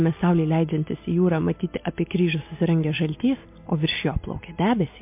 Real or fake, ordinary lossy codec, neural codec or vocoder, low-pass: real; MP3, 32 kbps; none; 3.6 kHz